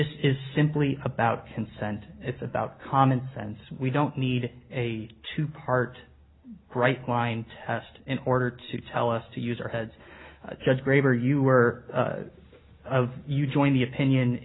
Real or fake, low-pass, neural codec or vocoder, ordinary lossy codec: real; 7.2 kHz; none; AAC, 16 kbps